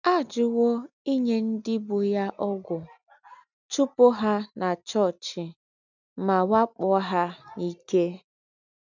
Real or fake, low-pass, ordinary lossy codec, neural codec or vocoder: real; 7.2 kHz; none; none